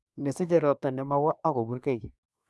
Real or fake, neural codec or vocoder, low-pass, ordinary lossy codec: fake; codec, 24 kHz, 1 kbps, SNAC; none; none